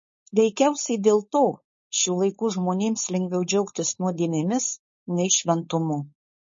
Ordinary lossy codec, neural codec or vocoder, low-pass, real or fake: MP3, 32 kbps; codec, 16 kHz, 4.8 kbps, FACodec; 7.2 kHz; fake